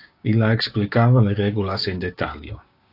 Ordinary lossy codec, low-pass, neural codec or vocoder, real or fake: AAC, 32 kbps; 5.4 kHz; codec, 16 kHz, 6 kbps, DAC; fake